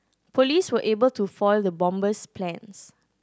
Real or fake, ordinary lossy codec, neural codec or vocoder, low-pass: real; none; none; none